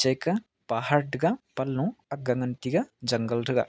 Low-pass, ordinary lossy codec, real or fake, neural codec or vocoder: none; none; real; none